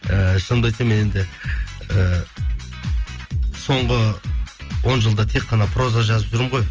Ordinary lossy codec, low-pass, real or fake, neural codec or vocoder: Opus, 24 kbps; 7.2 kHz; real; none